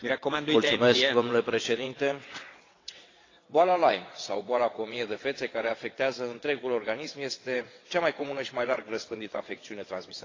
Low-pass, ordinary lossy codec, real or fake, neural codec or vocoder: 7.2 kHz; AAC, 32 kbps; fake; vocoder, 22.05 kHz, 80 mel bands, WaveNeXt